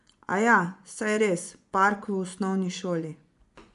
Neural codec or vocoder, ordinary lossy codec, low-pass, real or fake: vocoder, 24 kHz, 100 mel bands, Vocos; none; 10.8 kHz; fake